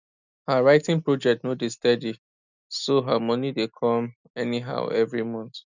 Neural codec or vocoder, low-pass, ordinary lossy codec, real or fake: none; 7.2 kHz; none; real